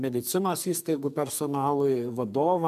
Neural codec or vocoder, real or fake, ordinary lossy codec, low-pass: codec, 44.1 kHz, 2.6 kbps, SNAC; fake; MP3, 96 kbps; 14.4 kHz